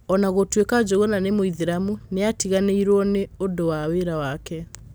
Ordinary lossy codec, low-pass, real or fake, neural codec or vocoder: none; none; real; none